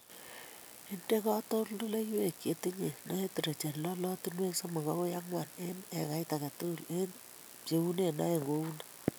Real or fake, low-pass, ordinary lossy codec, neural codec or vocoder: real; none; none; none